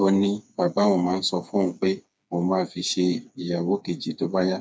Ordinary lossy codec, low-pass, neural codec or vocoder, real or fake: none; none; codec, 16 kHz, 4 kbps, FreqCodec, smaller model; fake